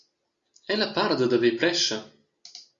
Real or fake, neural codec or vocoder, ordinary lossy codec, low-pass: real; none; Opus, 64 kbps; 7.2 kHz